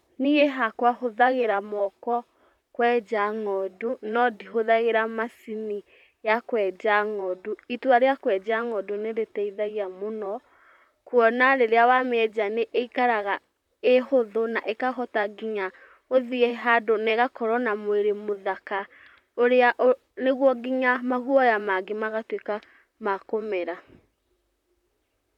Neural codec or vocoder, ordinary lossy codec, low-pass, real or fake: vocoder, 44.1 kHz, 128 mel bands, Pupu-Vocoder; none; 19.8 kHz; fake